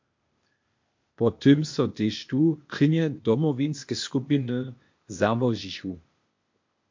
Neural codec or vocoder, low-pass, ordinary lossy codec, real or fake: codec, 16 kHz, 0.8 kbps, ZipCodec; 7.2 kHz; MP3, 48 kbps; fake